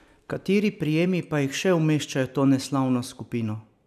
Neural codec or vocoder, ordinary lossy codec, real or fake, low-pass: none; none; real; 14.4 kHz